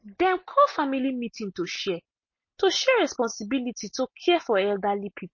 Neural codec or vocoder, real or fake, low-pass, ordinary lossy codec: none; real; 7.2 kHz; MP3, 32 kbps